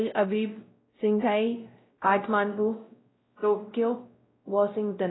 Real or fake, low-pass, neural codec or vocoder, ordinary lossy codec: fake; 7.2 kHz; codec, 16 kHz, 0.5 kbps, X-Codec, WavLM features, trained on Multilingual LibriSpeech; AAC, 16 kbps